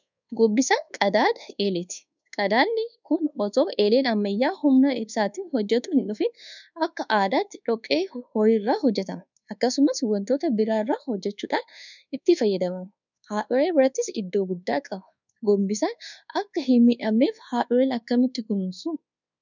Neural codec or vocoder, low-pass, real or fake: codec, 24 kHz, 1.2 kbps, DualCodec; 7.2 kHz; fake